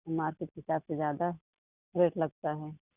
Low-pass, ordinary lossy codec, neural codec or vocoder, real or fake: 3.6 kHz; Opus, 64 kbps; none; real